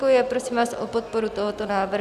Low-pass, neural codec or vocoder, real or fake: 14.4 kHz; none; real